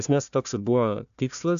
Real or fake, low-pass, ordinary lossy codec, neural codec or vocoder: fake; 7.2 kHz; AAC, 96 kbps; codec, 16 kHz, 1 kbps, FunCodec, trained on Chinese and English, 50 frames a second